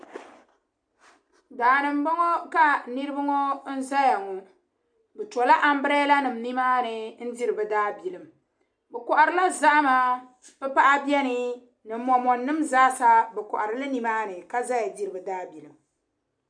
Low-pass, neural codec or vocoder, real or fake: 9.9 kHz; none; real